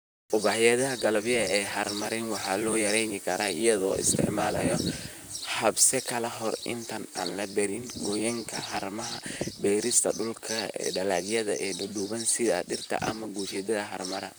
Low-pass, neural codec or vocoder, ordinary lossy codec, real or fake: none; vocoder, 44.1 kHz, 128 mel bands, Pupu-Vocoder; none; fake